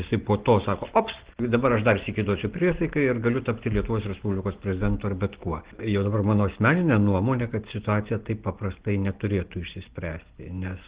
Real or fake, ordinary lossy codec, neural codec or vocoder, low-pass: real; Opus, 16 kbps; none; 3.6 kHz